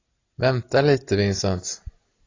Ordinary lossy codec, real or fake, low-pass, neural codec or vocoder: AAC, 32 kbps; real; 7.2 kHz; none